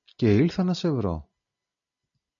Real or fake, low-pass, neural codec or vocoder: real; 7.2 kHz; none